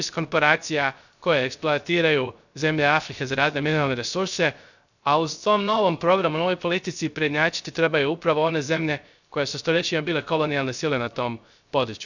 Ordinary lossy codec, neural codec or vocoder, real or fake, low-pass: none; codec, 16 kHz, 0.3 kbps, FocalCodec; fake; 7.2 kHz